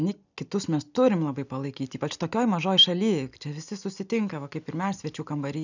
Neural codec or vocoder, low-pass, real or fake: none; 7.2 kHz; real